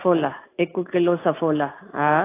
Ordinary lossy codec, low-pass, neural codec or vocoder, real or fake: AAC, 24 kbps; 3.6 kHz; none; real